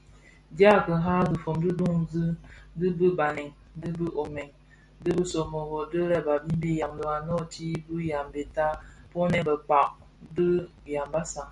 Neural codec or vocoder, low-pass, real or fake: none; 10.8 kHz; real